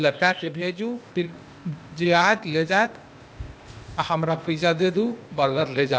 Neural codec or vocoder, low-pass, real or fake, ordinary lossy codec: codec, 16 kHz, 0.8 kbps, ZipCodec; none; fake; none